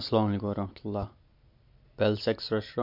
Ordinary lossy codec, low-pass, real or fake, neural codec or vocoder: MP3, 48 kbps; 5.4 kHz; real; none